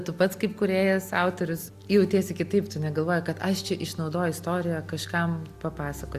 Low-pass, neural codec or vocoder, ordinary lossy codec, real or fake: 14.4 kHz; none; Opus, 64 kbps; real